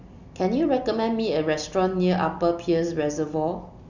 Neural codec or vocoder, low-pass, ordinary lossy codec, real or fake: none; 7.2 kHz; Opus, 64 kbps; real